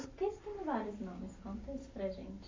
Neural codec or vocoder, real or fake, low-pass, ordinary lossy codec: none; real; 7.2 kHz; AAC, 32 kbps